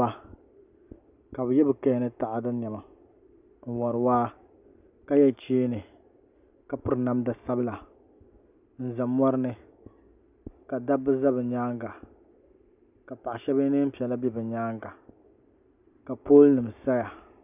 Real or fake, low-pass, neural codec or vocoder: real; 3.6 kHz; none